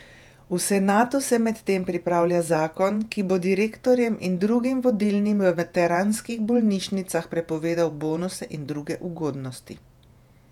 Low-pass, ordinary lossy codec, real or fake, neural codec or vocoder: 19.8 kHz; none; fake; vocoder, 48 kHz, 128 mel bands, Vocos